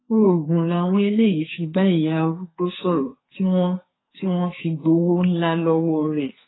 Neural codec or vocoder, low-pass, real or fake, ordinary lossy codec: codec, 44.1 kHz, 2.6 kbps, SNAC; 7.2 kHz; fake; AAC, 16 kbps